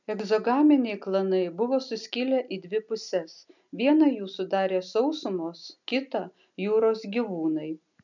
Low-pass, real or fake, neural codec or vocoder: 7.2 kHz; real; none